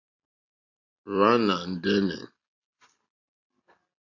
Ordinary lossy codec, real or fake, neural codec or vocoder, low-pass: AAC, 48 kbps; real; none; 7.2 kHz